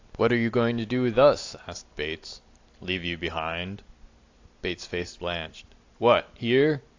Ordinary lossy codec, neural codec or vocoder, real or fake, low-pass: AAC, 48 kbps; vocoder, 44.1 kHz, 128 mel bands every 256 samples, BigVGAN v2; fake; 7.2 kHz